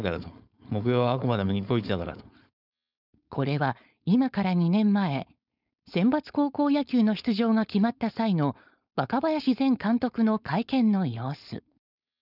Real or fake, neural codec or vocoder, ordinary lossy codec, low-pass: fake; codec, 16 kHz, 4.8 kbps, FACodec; none; 5.4 kHz